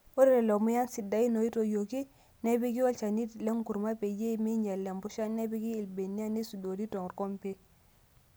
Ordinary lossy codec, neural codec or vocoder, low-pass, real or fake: none; none; none; real